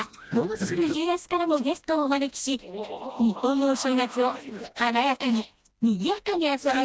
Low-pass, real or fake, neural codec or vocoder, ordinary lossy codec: none; fake; codec, 16 kHz, 1 kbps, FreqCodec, smaller model; none